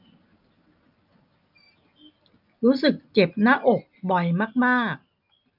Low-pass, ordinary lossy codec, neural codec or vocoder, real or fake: 5.4 kHz; none; none; real